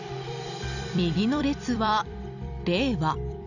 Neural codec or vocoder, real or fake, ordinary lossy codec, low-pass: vocoder, 44.1 kHz, 128 mel bands every 256 samples, BigVGAN v2; fake; none; 7.2 kHz